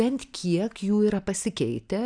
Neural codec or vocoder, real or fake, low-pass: none; real; 9.9 kHz